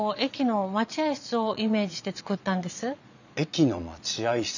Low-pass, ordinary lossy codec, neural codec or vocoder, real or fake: 7.2 kHz; none; vocoder, 22.05 kHz, 80 mel bands, Vocos; fake